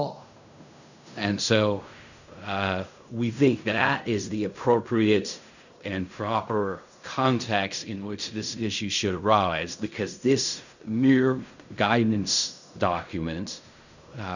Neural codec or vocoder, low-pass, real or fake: codec, 16 kHz in and 24 kHz out, 0.4 kbps, LongCat-Audio-Codec, fine tuned four codebook decoder; 7.2 kHz; fake